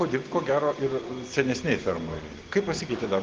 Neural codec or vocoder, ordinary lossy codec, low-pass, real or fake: none; Opus, 16 kbps; 7.2 kHz; real